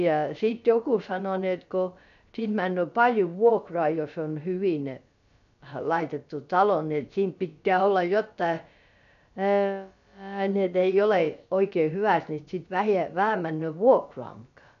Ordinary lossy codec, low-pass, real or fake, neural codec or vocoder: MP3, 96 kbps; 7.2 kHz; fake; codec, 16 kHz, about 1 kbps, DyCAST, with the encoder's durations